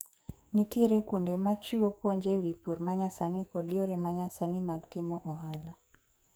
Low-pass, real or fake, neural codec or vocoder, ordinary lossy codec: none; fake; codec, 44.1 kHz, 2.6 kbps, SNAC; none